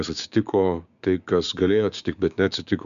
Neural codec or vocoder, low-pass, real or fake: codec, 16 kHz, 6 kbps, DAC; 7.2 kHz; fake